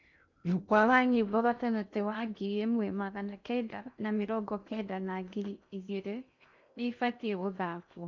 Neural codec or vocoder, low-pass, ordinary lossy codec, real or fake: codec, 16 kHz in and 24 kHz out, 0.6 kbps, FocalCodec, streaming, 2048 codes; 7.2 kHz; none; fake